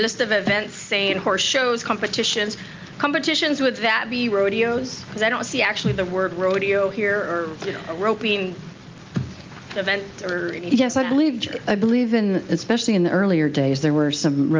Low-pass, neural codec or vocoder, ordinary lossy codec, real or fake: 7.2 kHz; none; Opus, 32 kbps; real